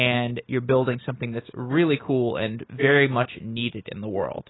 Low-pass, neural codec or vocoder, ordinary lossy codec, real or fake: 7.2 kHz; none; AAC, 16 kbps; real